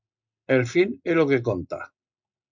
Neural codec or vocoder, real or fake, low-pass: none; real; 7.2 kHz